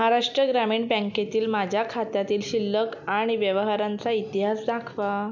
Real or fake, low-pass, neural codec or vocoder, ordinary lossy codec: real; 7.2 kHz; none; none